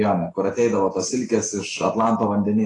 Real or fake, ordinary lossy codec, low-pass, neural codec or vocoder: real; AAC, 32 kbps; 10.8 kHz; none